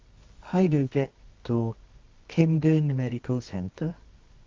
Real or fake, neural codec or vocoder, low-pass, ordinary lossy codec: fake; codec, 24 kHz, 0.9 kbps, WavTokenizer, medium music audio release; 7.2 kHz; Opus, 32 kbps